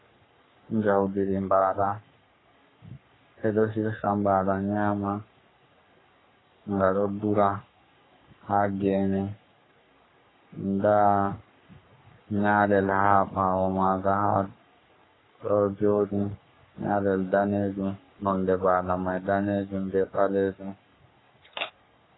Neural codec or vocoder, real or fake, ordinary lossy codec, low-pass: codec, 44.1 kHz, 3.4 kbps, Pupu-Codec; fake; AAC, 16 kbps; 7.2 kHz